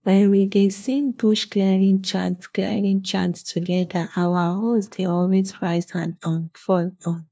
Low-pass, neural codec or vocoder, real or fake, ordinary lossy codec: none; codec, 16 kHz, 1 kbps, FunCodec, trained on LibriTTS, 50 frames a second; fake; none